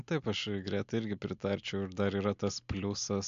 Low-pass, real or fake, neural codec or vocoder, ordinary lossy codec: 7.2 kHz; real; none; AAC, 64 kbps